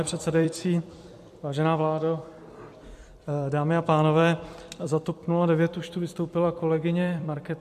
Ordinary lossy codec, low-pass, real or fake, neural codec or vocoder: MP3, 64 kbps; 14.4 kHz; fake; vocoder, 44.1 kHz, 128 mel bands every 256 samples, BigVGAN v2